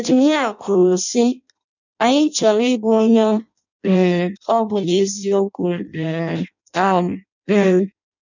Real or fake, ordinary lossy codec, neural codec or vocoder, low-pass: fake; none; codec, 16 kHz in and 24 kHz out, 0.6 kbps, FireRedTTS-2 codec; 7.2 kHz